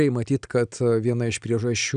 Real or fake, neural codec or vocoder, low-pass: real; none; 9.9 kHz